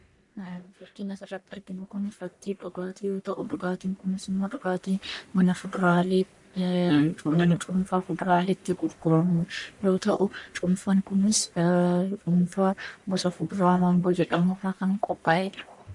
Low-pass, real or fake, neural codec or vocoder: 10.8 kHz; fake; codec, 44.1 kHz, 1.7 kbps, Pupu-Codec